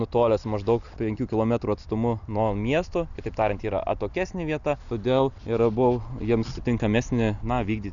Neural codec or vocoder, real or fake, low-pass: none; real; 7.2 kHz